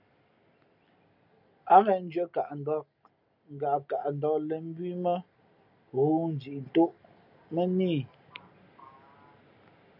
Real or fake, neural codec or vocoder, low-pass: real; none; 5.4 kHz